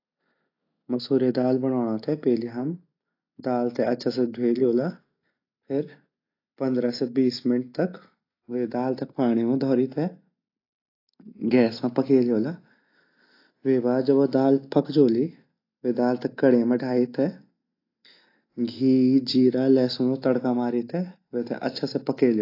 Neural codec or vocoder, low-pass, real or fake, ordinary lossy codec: none; 5.4 kHz; real; AAC, 32 kbps